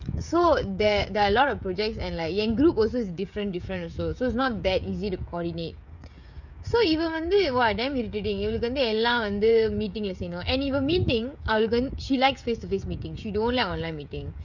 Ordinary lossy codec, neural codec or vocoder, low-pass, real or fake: none; codec, 16 kHz, 16 kbps, FreqCodec, smaller model; 7.2 kHz; fake